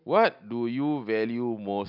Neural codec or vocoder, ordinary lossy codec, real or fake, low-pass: none; none; real; 5.4 kHz